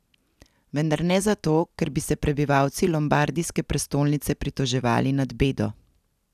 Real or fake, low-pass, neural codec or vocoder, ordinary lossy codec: real; 14.4 kHz; none; none